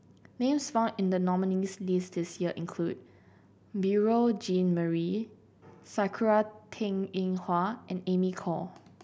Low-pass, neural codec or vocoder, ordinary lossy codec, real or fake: none; none; none; real